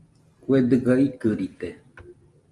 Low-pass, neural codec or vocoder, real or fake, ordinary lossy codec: 10.8 kHz; none; real; Opus, 32 kbps